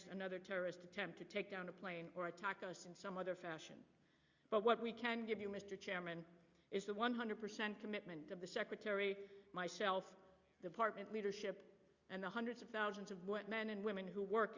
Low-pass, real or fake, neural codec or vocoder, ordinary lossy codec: 7.2 kHz; real; none; Opus, 64 kbps